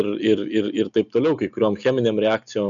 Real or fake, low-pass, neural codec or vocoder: real; 7.2 kHz; none